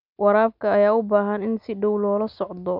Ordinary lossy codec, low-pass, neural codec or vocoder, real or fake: none; 5.4 kHz; none; real